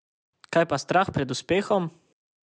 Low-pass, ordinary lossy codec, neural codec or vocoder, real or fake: none; none; none; real